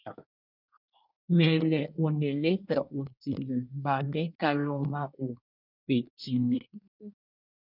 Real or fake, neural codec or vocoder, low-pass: fake; codec, 24 kHz, 1 kbps, SNAC; 5.4 kHz